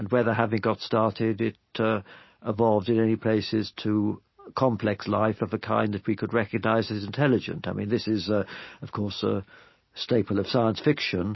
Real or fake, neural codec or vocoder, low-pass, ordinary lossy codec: real; none; 7.2 kHz; MP3, 24 kbps